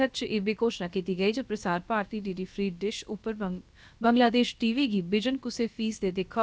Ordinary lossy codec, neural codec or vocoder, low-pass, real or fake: none; codec, 16 kHz, about 1 kbps, DyCAST, with the encoder's durations; none; fake